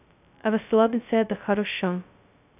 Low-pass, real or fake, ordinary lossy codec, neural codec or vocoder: 3.6 kHz; fake; none; codec, 16 kHz, 0.2 kbps, FocalCodec